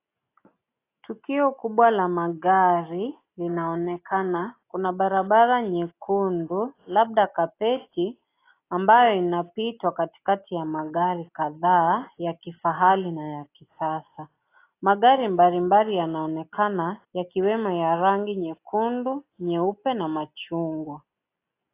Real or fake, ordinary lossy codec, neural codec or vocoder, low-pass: real; AAC, 24 kbps; none; 3.6 kHz